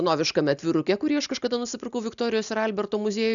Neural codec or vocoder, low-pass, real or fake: none; 7.2 kHz; real